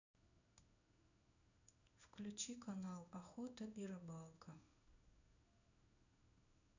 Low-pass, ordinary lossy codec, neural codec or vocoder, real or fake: 7.2 kHz; none; codec, 16 kHz in and 24 kHz out, 1 kbps, XY-Tokenizer; fake